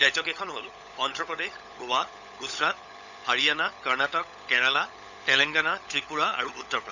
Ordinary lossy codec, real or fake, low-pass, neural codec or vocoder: none; fake; 7.2 kHz; codec, 16 kHz, 16 kbps, FunCodec, trained on LibriTTS, 50 frames a second